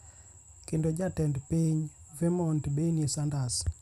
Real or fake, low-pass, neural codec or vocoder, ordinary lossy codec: fake; 14.4 kHz; vocoder, 44.1 kHz, 128 mel bands every 512 samples, BigVGAN v2; none